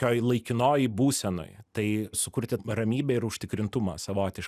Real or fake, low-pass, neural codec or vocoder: real; 14.4 kHz; none